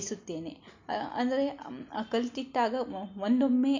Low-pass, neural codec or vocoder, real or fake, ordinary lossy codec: 7.2 kHz; none; real; MP3, 64 kbps